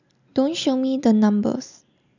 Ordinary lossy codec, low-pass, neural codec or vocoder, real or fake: none; 7.2 kHz; none; real